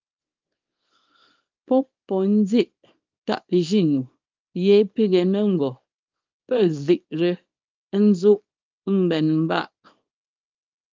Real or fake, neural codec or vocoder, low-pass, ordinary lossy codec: fake; codec, 24 kHz, 0.9 kbps, WavTokenizer, small release; 7.2 kHz; Opus, 32 kbps